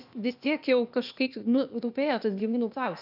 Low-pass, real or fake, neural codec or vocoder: 5.4 kHz; fake; codec, 16 kHz, 0.8 kbps, ZipCodec